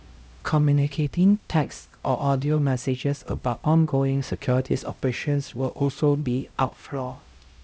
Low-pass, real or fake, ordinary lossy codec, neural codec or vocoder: none; fake; none; codec, 16 kHz, 0.5 kbps, X-Codec, HuBERT features, trained on LibriSpeech